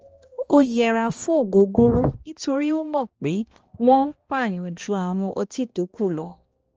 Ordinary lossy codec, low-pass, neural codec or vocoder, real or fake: Opus, 24 kbps; 7.2 kHz; codec, 16 kHz, 1 kbps, X-Codec, HuBERT features, trained on balanced general audio; fake